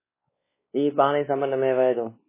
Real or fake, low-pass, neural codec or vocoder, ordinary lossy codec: fake; 3.6 kHz; codec, 16 kHz, 2 kbps, X-Codec, WavLM features, trained on Multilingual LibriSpeech; MP3, 16 kbps